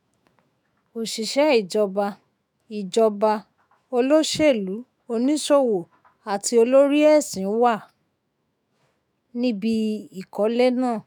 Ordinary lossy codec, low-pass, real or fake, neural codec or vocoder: none; none; fake; autoencoder, 48 kHz, 128 numbers a frame, DAC-VAE, trained on Japanese speech